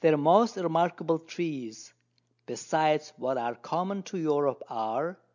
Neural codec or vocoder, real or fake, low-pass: none; real; 7.2 kHz